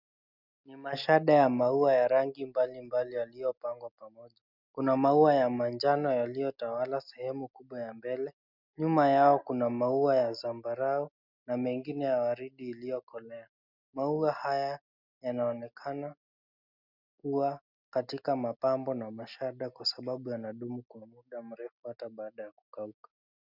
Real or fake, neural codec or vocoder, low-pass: real; none; 5.4 kHz